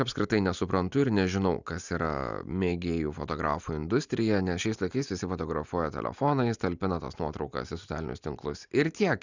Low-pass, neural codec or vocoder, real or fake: 7.2 kHz; none; real